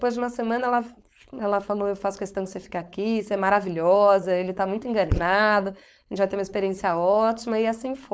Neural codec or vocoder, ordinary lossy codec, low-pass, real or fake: codec, 16 kHz, 4.8 kbps, FACodec; none; none; fake